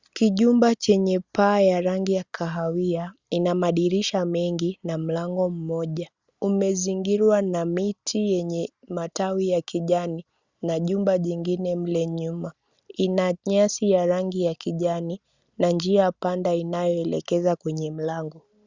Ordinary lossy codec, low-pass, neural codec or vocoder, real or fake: Opus, 64 kbps; 7.2 kHz; none; real